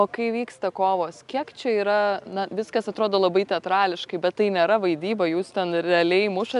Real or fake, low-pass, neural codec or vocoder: real; 10.8 kHz; none